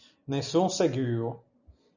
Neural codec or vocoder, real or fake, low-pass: none; real; 7.2 kHz